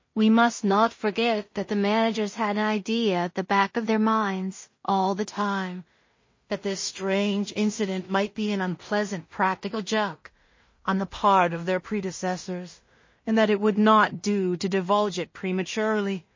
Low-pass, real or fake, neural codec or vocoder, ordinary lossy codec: 7.2 kHz; fake; codec, 16 kHz in and 24 kHz out, 0.4 kbps, LongCat-Audio-Codec, two codebook decoder; MP3, 32 kbps